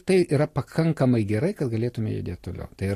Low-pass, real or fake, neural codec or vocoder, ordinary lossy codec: 14.4 kHz; real; none; AAC, 48 kbps